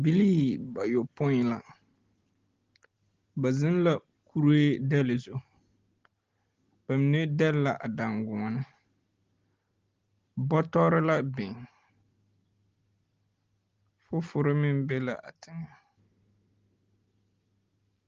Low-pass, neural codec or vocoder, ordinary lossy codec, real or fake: 7.2 kHz; none; Opus, 16 kbps; real